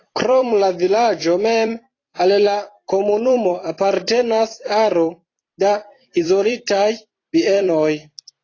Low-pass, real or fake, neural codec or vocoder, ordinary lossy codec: 7.2 kHz; fake; vocoder, 44.1 kHz, 128 mel bands every 256 samples, BigVGAN v2; AAC, 32 kbps